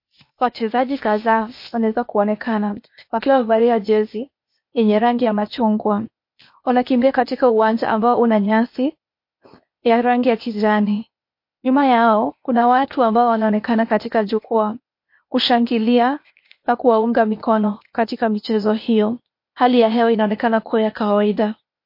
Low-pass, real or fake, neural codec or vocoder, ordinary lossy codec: 5.4 kHz; fake; codec, 16 kHz, 0.8 kbps, ZipCodec; MP3, 32 kbps